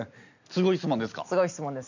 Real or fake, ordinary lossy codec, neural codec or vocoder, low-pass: real; none; none; 7.2 kHz